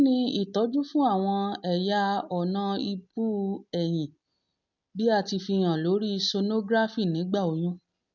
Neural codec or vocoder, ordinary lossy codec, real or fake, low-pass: none; none; real; 7.2 kHz